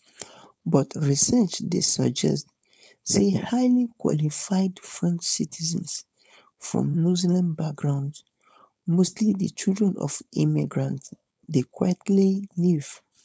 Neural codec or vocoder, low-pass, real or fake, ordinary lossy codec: codec, 16 kHz, 4.8 kbps, FACodec; none; fake; none